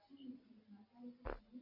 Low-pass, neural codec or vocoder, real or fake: 5.4 kHz; none; real